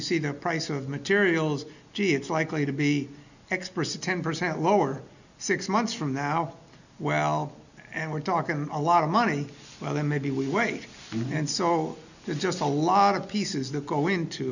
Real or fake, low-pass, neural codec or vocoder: real; 7.2 kHz; none